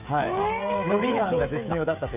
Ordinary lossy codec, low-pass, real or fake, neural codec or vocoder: none; 3.6 kHz; fake; codec, 16 kHz, 16 kbps, FreqCodec, smaller model